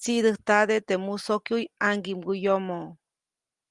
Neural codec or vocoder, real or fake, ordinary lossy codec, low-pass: none; real; Opus, 24 kbps; 10.8 kHz